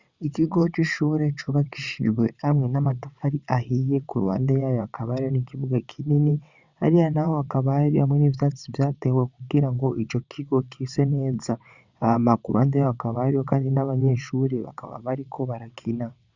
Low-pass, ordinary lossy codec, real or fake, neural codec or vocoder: 7.2 kHz; Opus, 64 kbps; fake; vocoder, 22.05 kHz, 80 mel bands, WaveNeXt